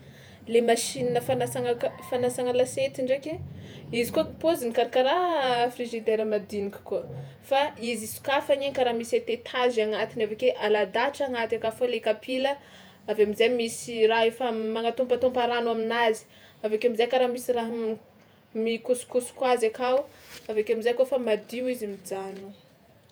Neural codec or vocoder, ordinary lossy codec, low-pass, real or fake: vocoder, 48 kHz, 128 mel bands, Vocos; none; none; fake